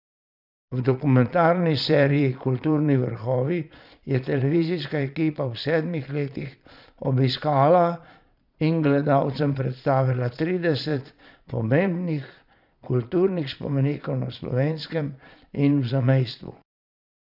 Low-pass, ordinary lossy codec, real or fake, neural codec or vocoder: 5.4 kHz; none; fake; vocoder, 22.05 kHz, 80 mel bands, Vocos